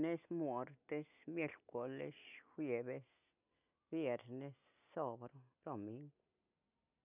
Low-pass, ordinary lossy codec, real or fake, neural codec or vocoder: 3.6 kHz; none; real; none